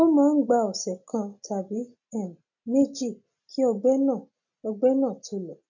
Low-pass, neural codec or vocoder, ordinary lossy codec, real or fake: 7.2 kHz; none; none; real